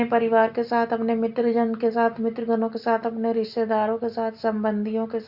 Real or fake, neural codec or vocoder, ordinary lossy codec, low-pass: real; none; Opus, 64 kbps; 5.4 kHz